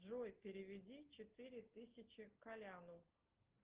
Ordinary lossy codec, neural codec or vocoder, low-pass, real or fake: Opus, 16 kbps; none; 3.6 kHz; real